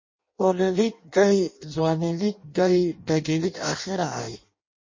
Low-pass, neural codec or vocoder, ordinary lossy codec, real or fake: 7.2 kHz; codec, 16 kHz in and 24 kHz out, 0.6 kbps, FireRedTTS-2 codec; MP3, 32 kbps; fake